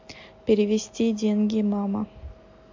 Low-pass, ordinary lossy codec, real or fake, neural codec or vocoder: 7.2 kHz; MP3, 48 kbps; real; none